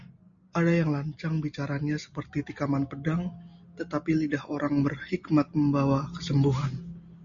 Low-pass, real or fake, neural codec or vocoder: 7.2 kHz; real; none